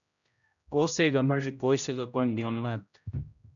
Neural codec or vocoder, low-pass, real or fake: codec, 16 kHz, 0.5 kbps, X-Codec, HuBERT features, trained on general audio; 7.2 kHz; fake